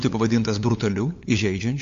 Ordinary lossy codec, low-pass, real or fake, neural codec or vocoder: AAC, 48 kbps; 7.2 kHz; fake; codec, 16 kHz, 8 kbps, FunCodec, trained on LibriTTS, 25 frames a second